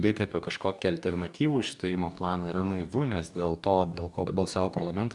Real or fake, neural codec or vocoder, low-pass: fake; codec, 44.1 kHz, 2.6 kbps, DAC; 10.8 kHz